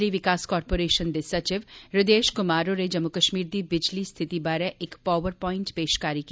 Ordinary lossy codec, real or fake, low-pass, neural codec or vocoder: none; real; none; none